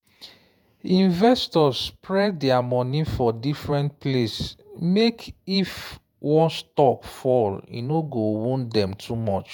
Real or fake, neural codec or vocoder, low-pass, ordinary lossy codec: fake; vocoder, 48 kHz, 128 mel bands, Vocos; none; none